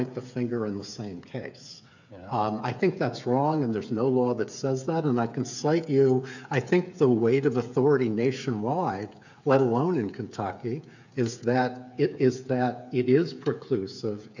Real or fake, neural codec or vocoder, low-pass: fake; codec, 16 kHz, 8 kbps, FreqCodec, smaller model; 7.2 kHz